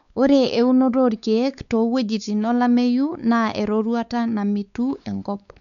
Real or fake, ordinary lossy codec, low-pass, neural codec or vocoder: fake; none; 7.2 kHz; codec, 16 kHz, 4 kbps, X-Codec, WavLM features, trained on Multilingual LibriSpeech